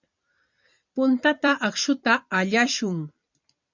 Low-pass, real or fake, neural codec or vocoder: 7.2 kHz; fake; vocoder, 44.1 kHz, 128 mel bands every 256 samples, BigVGAN v2